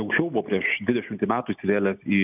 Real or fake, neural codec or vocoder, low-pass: real; none; 3.6 kHz